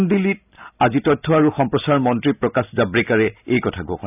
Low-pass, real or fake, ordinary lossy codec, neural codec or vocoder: 3.6 kHz; real; none; none